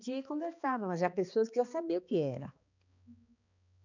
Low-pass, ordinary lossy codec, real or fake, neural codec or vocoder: 7.2 kHz; none; fake; codec, 16 kHz, 2 kbps, X-Codec, HuBERT features, trained on balanced general audio